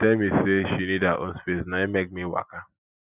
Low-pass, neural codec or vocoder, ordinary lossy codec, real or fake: 3.6 kHz; none; none; real